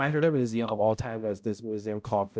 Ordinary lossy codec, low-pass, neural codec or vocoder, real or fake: none; none; codec, 16 kHz, 0.5 kbps, X-Codec, HuBERT features, trained on balanced general audio; fake